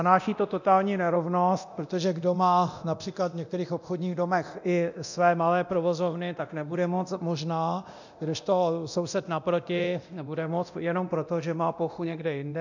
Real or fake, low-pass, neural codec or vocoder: fake; 7.2 kHz; codec, 24 kHz, 0.9 kbps, DualCodec